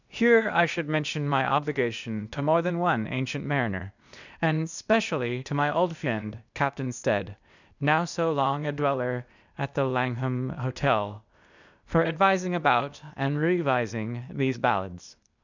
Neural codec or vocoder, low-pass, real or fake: codec, 16 kHz, 0.8 kbps, ZipCodec; 7.2 kHz; fake